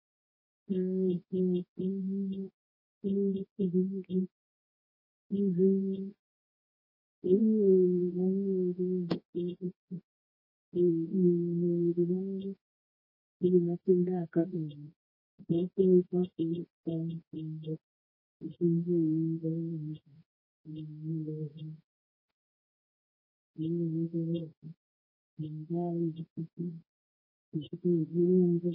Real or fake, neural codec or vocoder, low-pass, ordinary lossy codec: fake; codec, 24 kHz, 0.9 kbps, WavTokenizer, medium music audio release; 5.4 kHz; MP3, 24 kbps